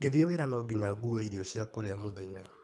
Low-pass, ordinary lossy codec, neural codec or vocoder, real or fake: none; none; codec, 24 kHz, 3 kbps, HILCodec; fake